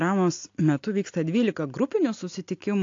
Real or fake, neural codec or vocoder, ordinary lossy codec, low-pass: real; none; AAC, 48 kbps; 7.2 kHz